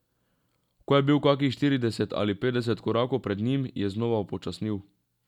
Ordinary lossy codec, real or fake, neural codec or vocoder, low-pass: none; real; none; 19.8 kHz